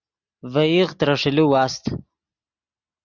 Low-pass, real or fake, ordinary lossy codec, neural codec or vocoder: 7.2 kHz; real; Opus, 64 kbps; none